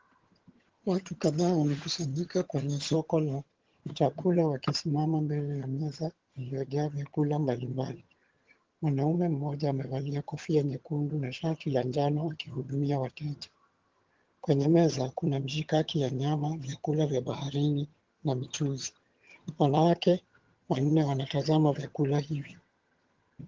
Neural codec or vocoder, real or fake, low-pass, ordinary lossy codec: vocoder, 22.05 kHz, 80 mel bands, HiFi-GAN; fake; 7.2 kHz; Opus, 16 kbps